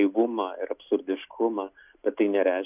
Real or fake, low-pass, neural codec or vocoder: real; 3.6 kHz; none